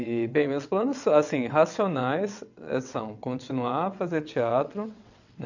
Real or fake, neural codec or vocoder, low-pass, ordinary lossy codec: fake; vocoder, 22.05 kHz, 80 mel bands, WaveNeXt; 7.2 kHz; none